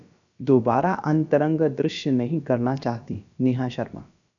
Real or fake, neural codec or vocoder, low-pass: fake; codec, 16 kHz, about 1 kbps, DyCAST, with the encoder's durations; 7.2 kHz